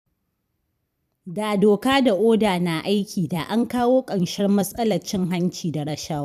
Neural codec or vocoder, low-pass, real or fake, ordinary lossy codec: vocoder, 44.1 kHz, 128 mel bands every 256 samples, BigVGAN v2; 14.4 kHz; fake; none